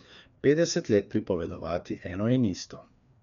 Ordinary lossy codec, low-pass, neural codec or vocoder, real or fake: none; 7.2 kHz; codec, 16 kHz, 2 kbps, FreqCodec, larger model; fake